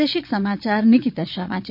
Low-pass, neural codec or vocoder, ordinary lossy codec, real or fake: 5.4 kHz; vocoder, 22.05 kHz, 80 mel bands, Vocos; none; fake